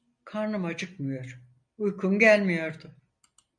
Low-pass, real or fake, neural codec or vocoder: 9.9 kHz; real; none